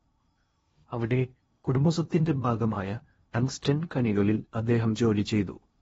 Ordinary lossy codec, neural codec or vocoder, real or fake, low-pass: AAC, 24 kbps; codec, 16 kHz in and 24 kHz out, 0.8 kbps, FocalCodec, streaming, 65536 codes; fake; 10.8 kHz